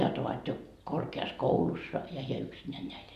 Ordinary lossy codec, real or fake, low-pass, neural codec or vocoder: Opus, 64 kbps; real; 14.4 kHz; none